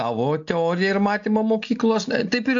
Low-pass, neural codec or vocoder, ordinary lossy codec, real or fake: 7.2 kHz; none; AAC, 64 kbps; real